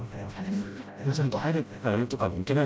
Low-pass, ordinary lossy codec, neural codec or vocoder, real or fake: none; none; codec, 16 kHz, 0.5 kbps, FreqCodec, smaller model; fake